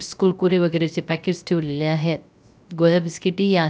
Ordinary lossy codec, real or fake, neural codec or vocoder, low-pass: none; fake; codec, 16 kHz, 0.3 kbps, FocalCodec; none